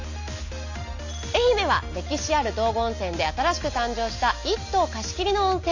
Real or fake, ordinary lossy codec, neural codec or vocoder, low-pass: real; none; none; 7.2 kHz